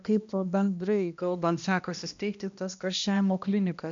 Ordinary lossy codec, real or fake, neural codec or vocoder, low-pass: MP3, 64 kbps; fake; codec, 16 kHz, 1 kbps, X-Codec, HuBERT features, trained on balanced general audio; 7.2 kHz